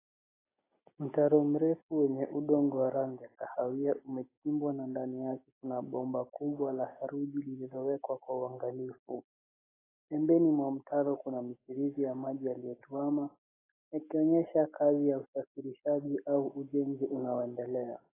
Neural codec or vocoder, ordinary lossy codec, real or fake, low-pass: none; AAC, 16 kbps; real; 3.6 kHz